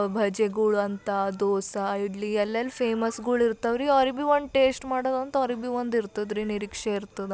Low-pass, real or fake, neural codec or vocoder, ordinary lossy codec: none; real; none; none